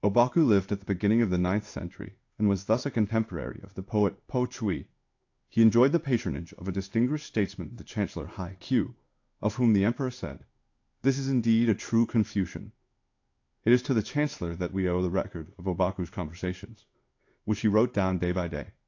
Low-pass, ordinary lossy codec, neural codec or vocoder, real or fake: 7.2 kHz; AAC, 48 kbps; codec, 16 kHz in and 24 kHz out, 1 kbps, XY-Tokenizer; fake